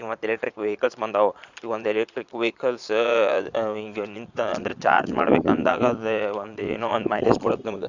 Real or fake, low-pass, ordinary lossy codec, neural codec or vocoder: fake; 7.2 kHz; Opus, 64 kbps; vocoder, 22.05 kHz, 80 mel bands, Vocos